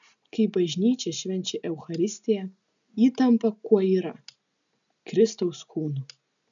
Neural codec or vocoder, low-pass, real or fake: none; 7.2 kHz; real